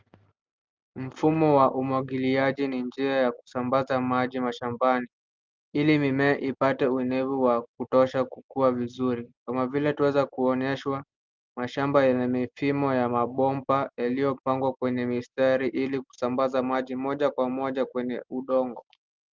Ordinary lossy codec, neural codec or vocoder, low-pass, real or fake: Opus, 32 kbps; none; 7.2 kHz; real